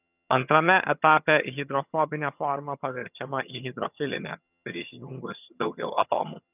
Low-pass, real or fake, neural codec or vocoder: 3.6 kHz; fake; vocoder, 22.05 kHz, 80 mel bands, HiFi-GAN